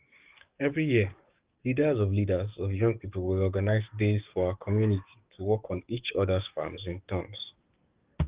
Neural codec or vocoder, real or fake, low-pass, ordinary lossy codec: codec, 44.1 kHz, 7.8 kbps, DAC; fake; 3.6 kHz; Opus, 32 kbps